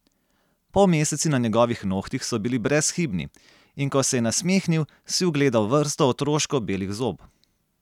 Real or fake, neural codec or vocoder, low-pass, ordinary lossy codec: real; none; 19.8 kHz; none